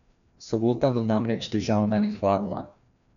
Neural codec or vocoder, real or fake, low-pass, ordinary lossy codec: codec, 16 kHz, 1 kbps, FreqCodec, larger model; fake; 7.2 kHz; none